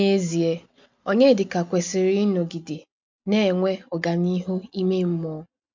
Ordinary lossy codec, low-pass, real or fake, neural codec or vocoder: MP3, 64 kbps; 7.2 kHz; real; none